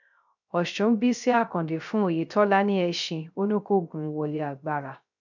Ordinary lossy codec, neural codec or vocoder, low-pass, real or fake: none; codec, 16 kHz, 0.3 kbps, FocalCodec; 7.2 kHz; fake